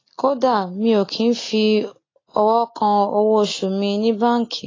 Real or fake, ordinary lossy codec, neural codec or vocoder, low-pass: real; AAC, 32 kbps; none; 7.2 kHz